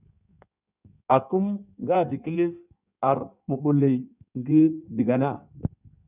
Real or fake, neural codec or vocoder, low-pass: fake; codec, 16 kHz in and 24 kHz out, 1.1 kbps, FireRedTTS-2 codec; 3.6 kHz